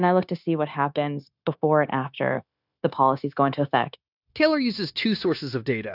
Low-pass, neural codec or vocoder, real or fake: 5.4 kHz; codec, 16 kHz, 0.9 kbps, LongCat-Audio-Codec; fake